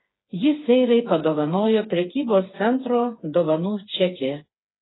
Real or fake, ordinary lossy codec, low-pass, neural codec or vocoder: fake; AAC, 16 kbps; 7.2 kHz; codec, 16 kHz, 4 kbps, FreqCodec, smaller model